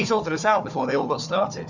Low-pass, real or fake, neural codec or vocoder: 7.2 kHz; fake; codec, 16 kHz, 4 kbps, FunCodec, trained on Chinese and English, 50 frames a second